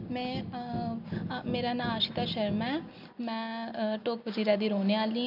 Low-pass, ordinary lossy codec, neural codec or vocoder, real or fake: 5.4 kHz; none; none; real